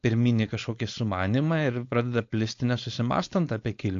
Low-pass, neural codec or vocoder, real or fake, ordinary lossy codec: 7.2 kHz; codec, 16 kHz, 4.8 kbps, FACodec; fake; AAC, 48 kbps